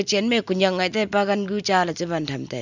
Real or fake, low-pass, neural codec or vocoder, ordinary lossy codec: real; 7.2 kHz; none; none